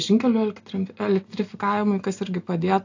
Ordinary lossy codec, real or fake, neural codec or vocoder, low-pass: AAC, 48 kbps; real; none; 7.2 kHz